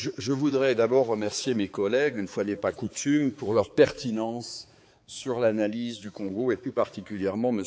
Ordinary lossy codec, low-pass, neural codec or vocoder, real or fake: none; none; codec, 16 kHz, 4 kbps, X-Codec, HuBERT features, trained on balanced general audio; fake